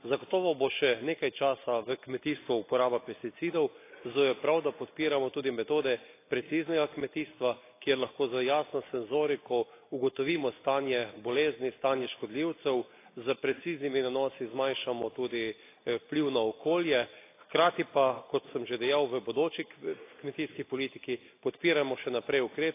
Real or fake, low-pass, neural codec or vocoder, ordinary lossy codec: real; 3.6 kHz; none; AAC, 24 kbps